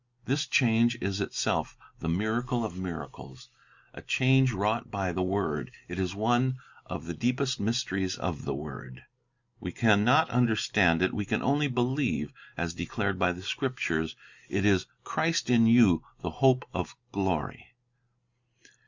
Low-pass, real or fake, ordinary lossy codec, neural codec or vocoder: 7.2 kHz; real; Opus, 64 kbps; none